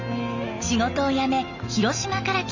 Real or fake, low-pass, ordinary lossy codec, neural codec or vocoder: real; 7.2 kHz; Opus, 64 kbps; none